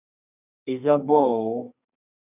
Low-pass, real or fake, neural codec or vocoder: 3.6 kHz; fake; codec, 32 kHz, 1.9 kbps, SNAC